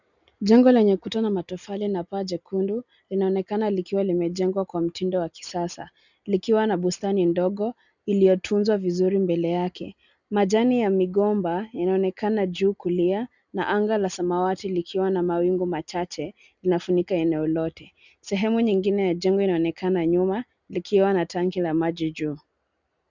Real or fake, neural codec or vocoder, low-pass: real; none; 7.2 kHz